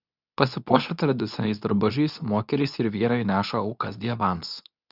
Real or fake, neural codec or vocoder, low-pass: fake; codec, 24 kHz, 0.9 kbps, WavTokenizer, medium speech release version 2; 5.4 kHz